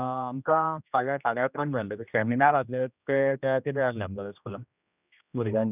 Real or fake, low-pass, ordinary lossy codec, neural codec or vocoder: fake; 3.6 kHz; none; codec, 16 kHz, 1 kbps, X-Codec, HuBERT features, trained on general audio